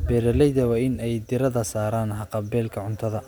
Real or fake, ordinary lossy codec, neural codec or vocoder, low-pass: real; none; none; none